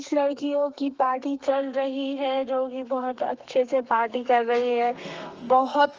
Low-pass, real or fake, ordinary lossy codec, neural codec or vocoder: 7.2 kHz; fake; Opus, 24 kbps; codec, 32 kHz, 1.9 kbps, SNAC